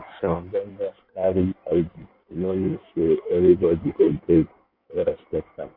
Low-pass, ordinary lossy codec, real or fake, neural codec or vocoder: 5.4 kHz; MP3, 48 kbps; fake; codec, 16 kHz in and 24 kHz out, 1.1 kbps, FireRedTTS-2 codec